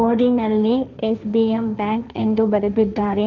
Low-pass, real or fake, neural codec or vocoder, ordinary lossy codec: 7.2 kHz; fake; codec, 16 kHz, 1.1 kbps, Voila-Tokenizer; none